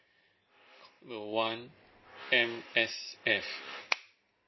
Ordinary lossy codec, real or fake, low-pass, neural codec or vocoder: MP3, 24 kbps; real; 7.2 kHz; none